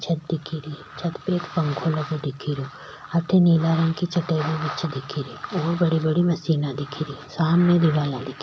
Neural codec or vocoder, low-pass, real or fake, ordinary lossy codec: none; none; real; none